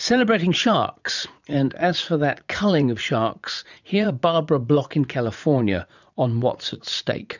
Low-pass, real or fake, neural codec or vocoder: 7.2 kHz; fake; vocoder, 44.1 kHz, 80 mel bands, Vocos